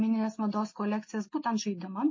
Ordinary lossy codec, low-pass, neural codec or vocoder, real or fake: MP3, 32 kbps; 7.2 kHz; none; real